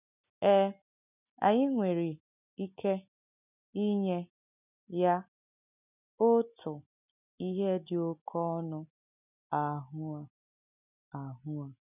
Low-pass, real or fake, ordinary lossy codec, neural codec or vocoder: 3.6 kHz; real; none; none